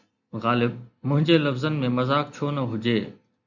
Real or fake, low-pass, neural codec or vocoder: real; 7.2 kHz; none